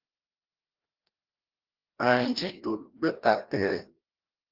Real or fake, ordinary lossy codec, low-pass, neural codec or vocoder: fake; Opus, 16 kbps; 5.4 kHz; codec, 16 kHz, 1 kbps, FreqCodec, larger model